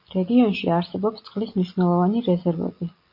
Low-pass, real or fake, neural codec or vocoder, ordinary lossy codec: 5.4 kHz; real; none; MP3, 32 kbps